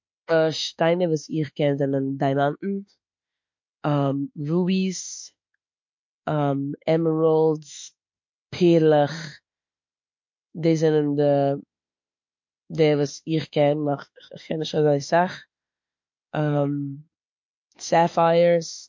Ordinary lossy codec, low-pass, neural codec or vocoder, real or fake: MP3, 48 kbps; 7.2 kHz; autoencoder, 48 kHz, 32 numbers a frame, DAC-VAE, trained on Japanese speech; fake